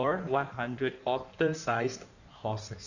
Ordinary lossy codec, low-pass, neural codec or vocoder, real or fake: none; 7.2 kHz; codec, 16 kHz in and 24 kHz out, 1.1 kbps, FireRedTTS-2 codec; fake